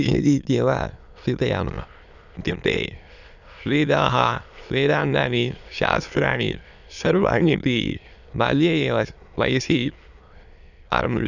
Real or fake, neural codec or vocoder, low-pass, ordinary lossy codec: fake; autoencoder, 22.05 kHz, a latent of 192 numbers a frame, VITS, trained on many speakers; 7.2 kHz; none